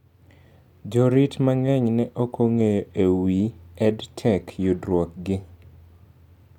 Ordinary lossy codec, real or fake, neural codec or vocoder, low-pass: none; real; none; 19.8 kHz